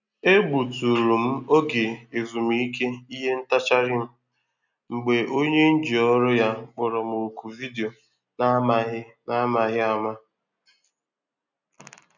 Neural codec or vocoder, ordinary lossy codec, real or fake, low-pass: none; none; real; 7.2 kHz